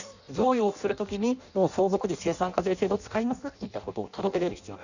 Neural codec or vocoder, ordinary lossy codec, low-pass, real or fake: codec, 16 kHz in and 24 kHz out, 0.6 kbps, FireRedTTS-2 codec; none; 7.2 kHz; fake